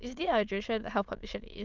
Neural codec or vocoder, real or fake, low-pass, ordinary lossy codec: autoencoder, 22.05 kHz, a latent of 192 numbers a frame, VITS, trained on many speakers; fake; 7.2 kHz; Opus, 24 kbps